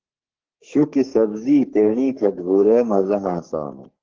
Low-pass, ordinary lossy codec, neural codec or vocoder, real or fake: 7.2 kHz; Opus, 16 kbps; codec, 44.1 kHz, 3.4 kbps, Pupu-Codec; fake